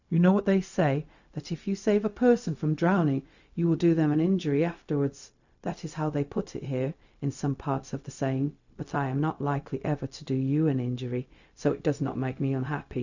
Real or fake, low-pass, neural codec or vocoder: fake; 7.2 kHz; codec, 16 kHz, 0.4 kbps, LongCat-Audio-Codec